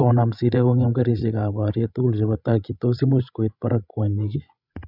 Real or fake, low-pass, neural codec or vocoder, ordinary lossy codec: fake; 5.4 kHz; codec, 16 kHz, 16 kbps, FreqCodec, larger model; none